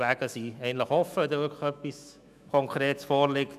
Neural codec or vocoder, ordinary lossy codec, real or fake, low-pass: autoencoder, 48 kHz, 128 numbers a frame, DAC-VAE, trained on Japanese speech; none; fake; 14.4 kHz